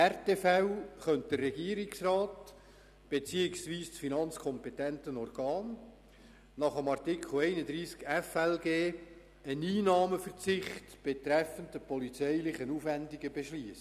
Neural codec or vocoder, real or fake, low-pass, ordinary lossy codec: none; real; 14.4 kHz; none